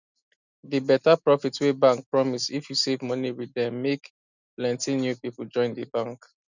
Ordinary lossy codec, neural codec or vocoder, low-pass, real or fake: none; none; 7.2 kHz; real